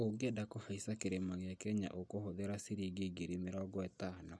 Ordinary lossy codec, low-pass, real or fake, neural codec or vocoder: none; none; real; none